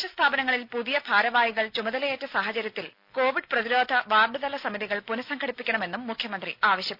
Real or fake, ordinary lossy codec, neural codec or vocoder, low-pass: real; none; none; 5.4 kHz